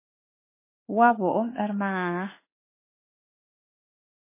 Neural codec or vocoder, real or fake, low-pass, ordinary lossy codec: autoencoder, 48 kHz, 32 numbers a frame, DAC-VAE, trained on Japanese speech; fake; 3.6 kHz; MP3, 16 kbps